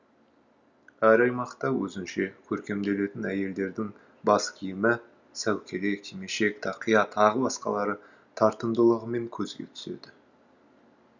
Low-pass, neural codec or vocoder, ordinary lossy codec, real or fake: 7.2 kHz; none; none; real